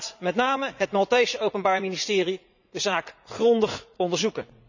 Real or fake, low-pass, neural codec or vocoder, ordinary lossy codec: fake; 7.2 kHz; vocoder, 44.1 kHz, 80 mel bands, Vocos; none